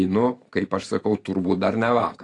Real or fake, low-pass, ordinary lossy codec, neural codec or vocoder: fake; 10.8 kHz; AAC, 48 kbps; vocoder, 44.1 kHz, 128 mel bands every 512 samples, BigVGAN v2